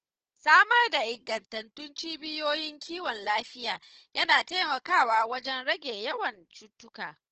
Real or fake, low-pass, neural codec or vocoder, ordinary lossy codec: fake; 7.2 kHz; codec, 16 kHz, 16 kbps, FunCodec, trained on Chinese and English, 50 frames a second; Opus, 16 kbps